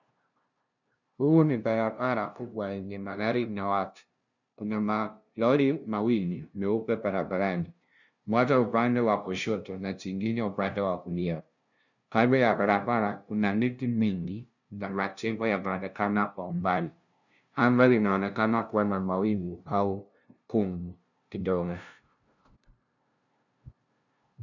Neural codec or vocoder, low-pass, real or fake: codec, 16 kHz, 0.5 kbps, FunCodec, trained on LibriTTS, 25 frames a second; 7.2 kHz; fake